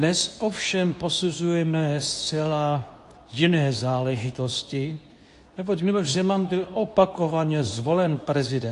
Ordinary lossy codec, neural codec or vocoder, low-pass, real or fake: AAC, 48 kbps; codec, 24 kHz, 0.9 kbps, WavTokenizer, medium speech release version 2; 10.8 kHz; fake